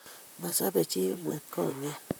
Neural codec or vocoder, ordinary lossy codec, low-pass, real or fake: vocoder, 44.1 kHz, 128 mel bands, Pupu-Vocoder; none; none; fake